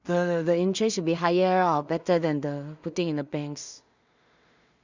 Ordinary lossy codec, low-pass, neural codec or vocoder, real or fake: Opus, 64 kbps; 7.2 kHz; codec, 16 kHz in and 24 kHz out, 0.4 kbps, LongCat-Audio-Codec, two codebook decoder; fake